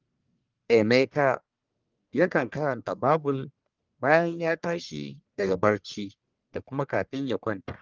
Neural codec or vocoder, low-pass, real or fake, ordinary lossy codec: codec, 44.1 kHz, 1.7 kbps, Pupu-Codec; 7.2 kHz; fake; Opus, 32 kbps